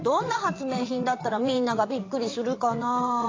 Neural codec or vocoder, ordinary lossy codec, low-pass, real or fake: vocoder, 22.05 kHz, 80 mel bands, WaveNeXt; AAC, 32 kbps; 7.2 kHz; fake